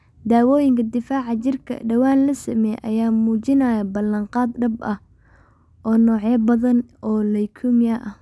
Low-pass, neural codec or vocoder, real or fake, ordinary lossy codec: none; none; real; none